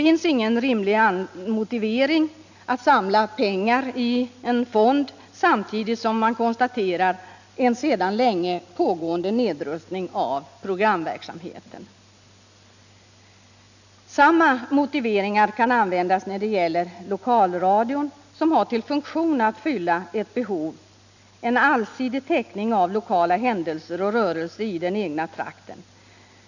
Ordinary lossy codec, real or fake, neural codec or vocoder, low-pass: none; real; none; 7.2 kHz